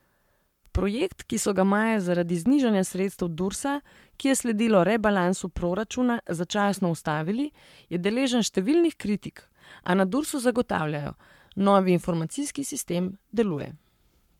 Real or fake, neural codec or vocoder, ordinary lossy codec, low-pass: fake; codec, 44.1 kHz, 7.8 kbps, Pupu-Codec; MP3, 96 kbps; 19.8 kHz